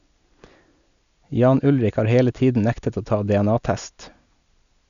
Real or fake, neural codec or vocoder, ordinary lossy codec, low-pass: real; none; none; 7.2 kHz